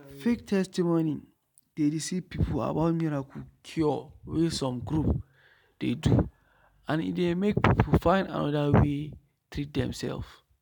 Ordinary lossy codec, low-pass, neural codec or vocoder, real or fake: none; 19.8 kHz; none; real